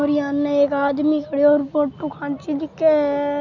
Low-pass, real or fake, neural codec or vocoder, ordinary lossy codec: 7.2 kHz; real; none; none